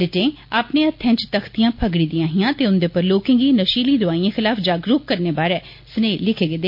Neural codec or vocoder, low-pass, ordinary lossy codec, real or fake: none; 5.4 kHz; MP3, 32 kbps; real